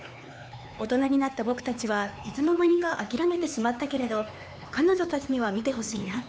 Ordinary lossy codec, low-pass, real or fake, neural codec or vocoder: none; none; fake; codec, 16 kHz, 4 kbps, X-Codec, HuBERT features, trained on LibriSpeech